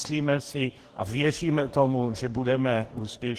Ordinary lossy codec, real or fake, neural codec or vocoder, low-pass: Opus, 16 kbps; fake; codec, 44.1 kHz, 2.6 kbps, DAC; 14.4 kHz